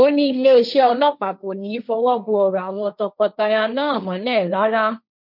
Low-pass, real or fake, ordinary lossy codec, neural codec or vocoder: 5.4 kHz; fake; none; codec, 16 kHz, 1.1 kbps, Voila-Tokenizer